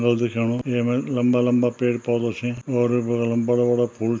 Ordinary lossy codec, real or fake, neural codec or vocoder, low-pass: none; real; none; none